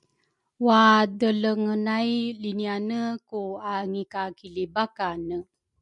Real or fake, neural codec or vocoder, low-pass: real; none; 10.8 kHz